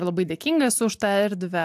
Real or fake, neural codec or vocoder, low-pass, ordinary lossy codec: real; none; 14.4 kHz; AAC, 96 kbps